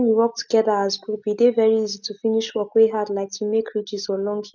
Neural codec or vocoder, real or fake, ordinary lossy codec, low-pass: none; real; none; 7.2 kHz